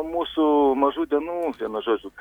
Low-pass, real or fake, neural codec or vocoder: 19.8 kHz; real; none